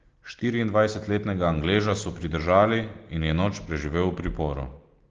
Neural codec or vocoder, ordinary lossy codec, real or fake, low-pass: none; Opus, 16 kbps; real; 7.2 kHz